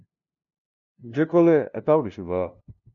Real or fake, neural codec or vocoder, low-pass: fake; codec, 16 kHz, 0.5 kbps, FunCodec, trained on LibriTTS, 25 frames a second; 7.2 kHz